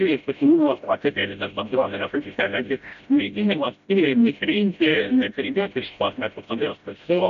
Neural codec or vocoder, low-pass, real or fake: codec, 16 kHz, 0.5 kbps, FreqCodec, smaller model; 7.2 kHz; fake